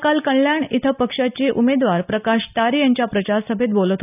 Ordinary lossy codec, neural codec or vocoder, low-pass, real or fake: none; none; 3.6 kHz; real